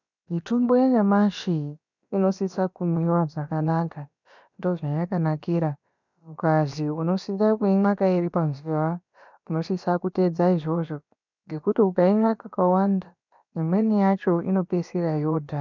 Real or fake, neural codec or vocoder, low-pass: fake; codec, 16 kHz, about 1 kbps, DyCAST, with the encoder's durations; 7.2 kHz